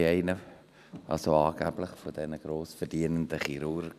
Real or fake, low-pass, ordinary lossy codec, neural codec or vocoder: real; 14.4 kHz; none; none